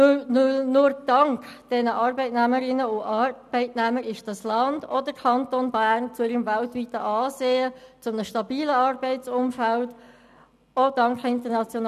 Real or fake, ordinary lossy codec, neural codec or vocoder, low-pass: real; none; none; 14.4 kHz